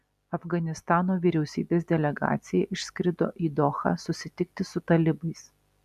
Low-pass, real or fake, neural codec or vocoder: 14.4 kHz; real; none